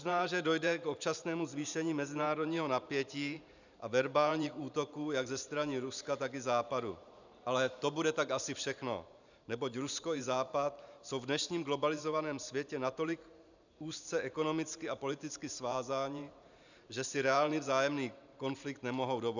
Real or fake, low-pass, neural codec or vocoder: fake; 7.2 kHz; vocoder, 44.1 kHz, 128 mel bands every 512 samples, BigVGAN v2